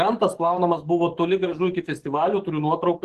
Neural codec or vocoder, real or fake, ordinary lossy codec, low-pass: codec, 44.1 kHz, 7.8 kbps, DAC; fake; Opus, 16 kbps; 14.4 kHz